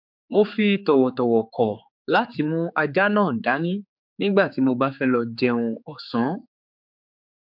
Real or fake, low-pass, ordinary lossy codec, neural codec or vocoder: fake; 5.4 kHz; MP3, 48 kbps; codec, 16 kHz, 4 kbps, X-Codec, HuBERT features, trained on general audio